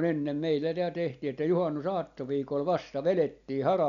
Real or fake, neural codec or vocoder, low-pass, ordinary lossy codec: real; none; 7.2 kHz; none